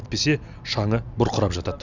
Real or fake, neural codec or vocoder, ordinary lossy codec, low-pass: real; none; none; 7.2 kHz